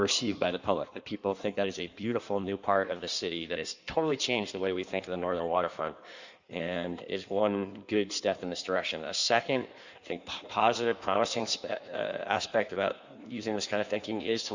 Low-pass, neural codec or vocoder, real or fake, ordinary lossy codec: 7.2 kHz; codec, 16 kHz in and 24 kHz out, 1.1 kbps, FireRedTTS-2 codec; fake; Opus, 64 kbps